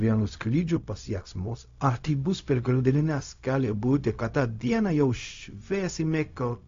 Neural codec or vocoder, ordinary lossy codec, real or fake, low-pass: codec, 16 kHz, 0.4 kbps, LongCat-Audio-Codec; AAC, 48 kbps; fake; 7.2 kHz